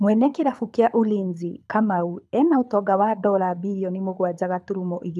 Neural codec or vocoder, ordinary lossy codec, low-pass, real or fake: codec, 24 kHz, 6 kbps, HILCodec; none; none; fake